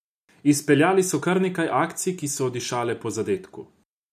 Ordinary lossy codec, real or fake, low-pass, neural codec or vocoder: none; real; 14.4 kHz; none